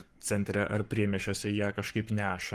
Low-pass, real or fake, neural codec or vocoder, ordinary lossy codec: 14.4 kHz; fake; codec, 44.1 kHz, 7.8 kbps, Pupu-Codec; Opus, 24 kbps